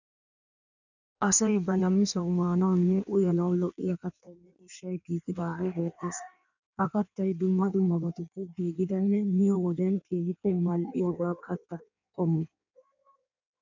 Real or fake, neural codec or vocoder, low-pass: fake; codec, 16 kHz in and 24 kHz out, 1.1 kbps, FireRedTTS-2 codec; 7.2 kHz